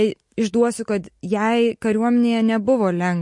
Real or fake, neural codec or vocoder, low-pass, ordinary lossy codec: real; none; 10.8 kHz; MP3, 48 kbps